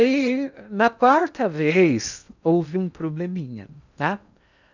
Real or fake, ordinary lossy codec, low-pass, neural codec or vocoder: fake; none; 7.2 kHz; codec, 16 kHz in and 24 kHz out, 0.8 kbps, FocalCodec, streaming, 65536 codes